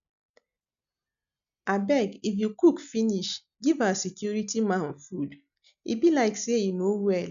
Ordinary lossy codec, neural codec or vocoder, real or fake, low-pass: none; none; real; 7.2 kHz